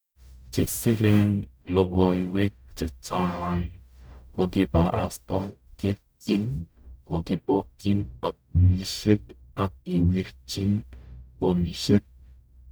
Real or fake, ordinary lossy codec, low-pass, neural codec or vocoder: fake; none; none; codec, 44.1 kHz, 0.9 kbps, DAC